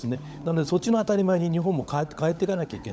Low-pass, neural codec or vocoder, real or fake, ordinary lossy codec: none; codec, 16 kHz, 16 kbps, FunCodec, trained on LibriTTS, 50 frames a second; fake; none